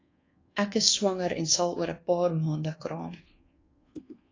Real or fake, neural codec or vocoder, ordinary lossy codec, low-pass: fake; codec, 24 kHz, 1.2 kbps, DualCodec; AAC, 32 kbps; 7.2 kHz